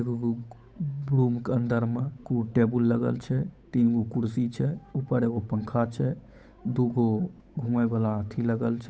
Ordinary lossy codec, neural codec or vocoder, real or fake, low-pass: none; codec, 16 kHz, 8 kbps, FunCodec, trained on Chinese and English, 25 frames a second; fake; none